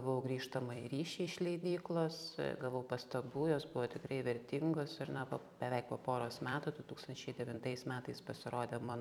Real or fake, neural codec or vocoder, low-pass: fake; vocoder, 44.1 kHz, 128 mel bands every 256 samples, BigVGAN v2; 19.8 kHz